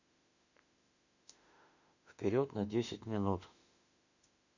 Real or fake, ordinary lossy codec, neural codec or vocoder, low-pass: fake; none; autoencoder, 48 kHz, 32 numbers a frame, DAC-VAE, trained on Japanese speech; 7.2 kHz